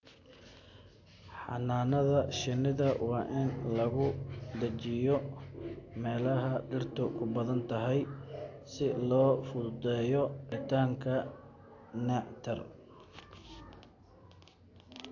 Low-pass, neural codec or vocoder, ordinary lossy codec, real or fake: 7.2 kHz; none; AAC, 48 kbps; real